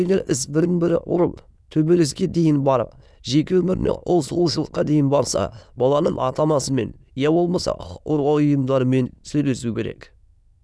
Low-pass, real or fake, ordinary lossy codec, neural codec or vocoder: none; fake; none; autoencoder, 22.05 kHz, a latent of 192 numbers a frame, VITS, trained on many speakers